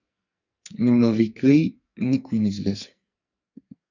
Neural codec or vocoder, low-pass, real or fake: codec, 44.1 kHz, 2.6 kbps, SNAC; 7.2 kHz; fake